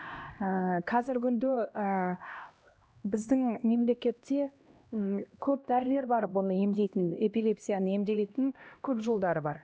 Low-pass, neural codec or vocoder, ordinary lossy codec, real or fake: none; codec, 16 kHz, 1 kbps, X-Codec, HuBERT features, trained on LibriSpeech; none; fake